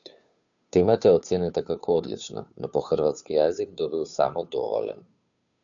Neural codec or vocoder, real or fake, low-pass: codec, 16 kHz, 2 kbps, FunCodec, trained on Chinese and English, 25 frames a second; fake; 7.2 kHz